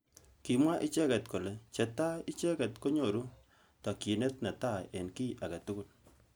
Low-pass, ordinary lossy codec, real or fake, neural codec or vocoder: none; none; real; none